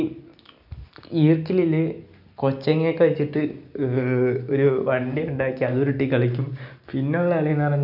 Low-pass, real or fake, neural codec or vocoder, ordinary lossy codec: 5.4 kHz; real; none; none